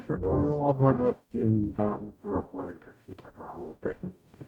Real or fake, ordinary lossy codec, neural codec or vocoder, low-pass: fake; none; codec, 44.1 kHz, 0.9 kbps, DAC; 19.8 kHz